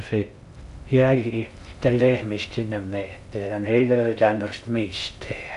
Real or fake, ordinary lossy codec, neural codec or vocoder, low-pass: fake; none; codec, 16 kHz in and 24 kHz out, 0.6 kbps, FocalCodec, streaming, 2048 codes; 10.8 kHz